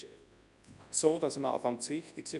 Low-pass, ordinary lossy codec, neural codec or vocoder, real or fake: 10.8 kHz; none; codec, 24 kHz, 0.9 kbps, WavTokenizer, large speech release; fake